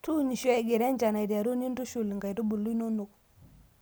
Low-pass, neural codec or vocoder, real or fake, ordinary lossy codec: none; none; real; none